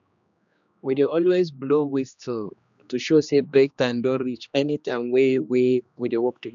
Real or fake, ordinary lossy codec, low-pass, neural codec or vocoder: fake; none; 7.2 kHz; codec, 16 kHz, 2 kbps, X-Codec, HuBERT features, trained on general audio